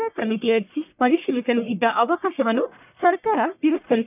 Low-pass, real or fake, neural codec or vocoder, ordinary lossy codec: 3.6 kHz; fake; codec, 44.1 kHz, 1.7 kbps, Pupu-Codec; none